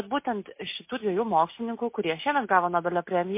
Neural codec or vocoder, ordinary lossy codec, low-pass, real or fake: none; MP3, 24 kbps; 3.6 kHz; real